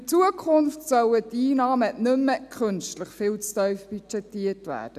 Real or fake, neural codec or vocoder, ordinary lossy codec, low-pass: real; none; none; 14.4 kHz